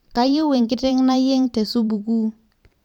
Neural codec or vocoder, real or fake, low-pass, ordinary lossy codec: vocoder, 44.1 kHz, 128 mel bands every 512 samples, BigVGAN v2; fake; 19.8 kHz; MP3, 96 kbps